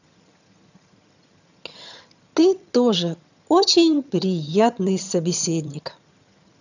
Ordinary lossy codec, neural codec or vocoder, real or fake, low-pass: none; vocoder, 22.05 kHz, 80 mel bands, HiFi-GAN; fake; 7.2 kHz